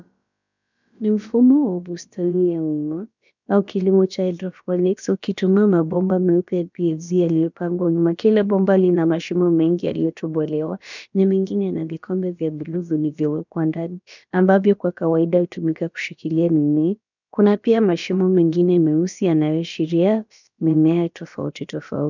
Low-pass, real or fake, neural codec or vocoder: 7.2 kHz; fake; codec, 16 kHz, about 1 kbps, DyCAST, with the encoder's durations